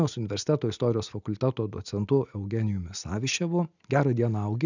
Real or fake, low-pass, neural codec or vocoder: real; 7.2 kHz; none